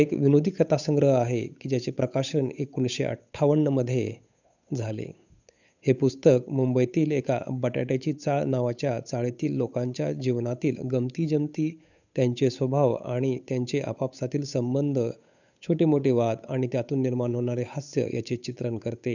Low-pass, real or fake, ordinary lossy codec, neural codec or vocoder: 7.2 kHz; fake; none; codec, 16 kHz, 8 kbps, FunCodec, trained on Chinese and English, 25 frames a second